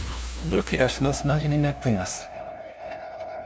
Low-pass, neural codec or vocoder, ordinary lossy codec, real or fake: none; codec, 16 kHz, 0.5 kbps, FunCodec, trained on LibriTTS, 25 frames a second; none; fake